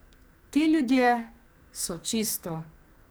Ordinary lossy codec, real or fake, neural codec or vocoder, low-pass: none; fake; codec, 44.1 kHz, 2.6 kbps, SNAC; none